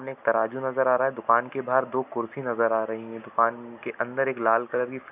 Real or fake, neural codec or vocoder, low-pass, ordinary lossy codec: real; none; 3.6 kHz; none